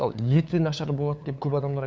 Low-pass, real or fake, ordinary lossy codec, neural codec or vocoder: none; fake; none; codec, 16 kHz, 8 kbps, FunCodec, trained on LibriTTS, 25 frames a second